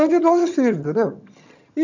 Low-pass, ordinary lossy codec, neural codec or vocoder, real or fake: 7.2 kHz; none; vocoder, 22.05 kHz, 80 mel bands, HiFi-GAN; fake